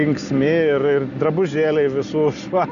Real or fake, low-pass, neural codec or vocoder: real; 7.2 kHz; none